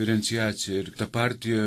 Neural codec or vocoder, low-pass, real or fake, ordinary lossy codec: vocoder, 44.1 kHz, 128 mel bands every 512 samples, BigVGAN v2; 14.4 kHz; fake; AAC, 48 kbps